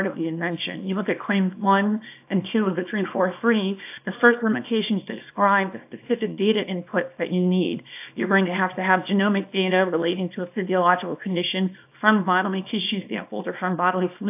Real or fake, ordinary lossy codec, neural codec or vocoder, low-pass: fake; AAC, 32 kbps; codec, 24 kHz, 0.9 kbps, WavTokenizer, small release; 3.6 kHz